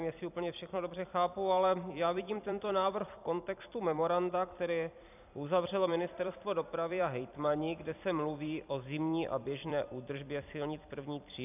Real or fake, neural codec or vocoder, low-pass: fake; vocoder, 44.1 kHz, 128 mel bands every 256 samples, BigVGAN v2; 3.6 kHz